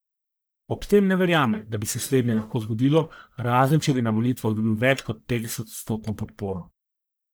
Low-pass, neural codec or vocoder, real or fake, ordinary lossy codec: none; codec, 44.1 kHz, 1.7 kbps, Pupu-Codec; fake; none